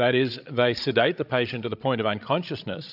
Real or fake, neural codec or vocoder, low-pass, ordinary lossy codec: fake; codec, 16 kHz, 16 kbps, FreqCodec, larger model; 5.4 kHz; AAC, 48 kbps